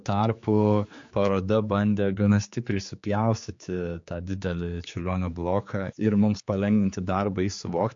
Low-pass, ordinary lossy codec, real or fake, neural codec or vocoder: 7.2 kHz; MP3, 48 kbps; fake; codec, 16 kHz, 4 kbps, X-Codec, HuBERT features, trained on general audio